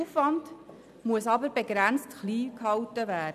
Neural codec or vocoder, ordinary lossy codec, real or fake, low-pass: none; none; real; 14.4 kHz